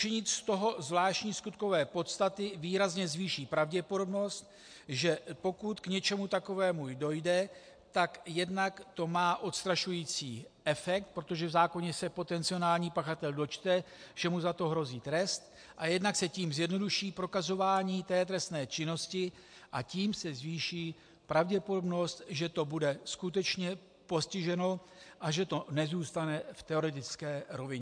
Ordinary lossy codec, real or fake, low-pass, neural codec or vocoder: MP3, 64 kbps; real; 9.9 kHz; none